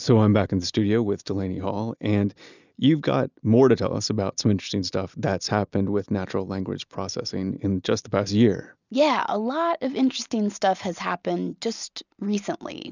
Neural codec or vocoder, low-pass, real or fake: none; 7.2 kHz; real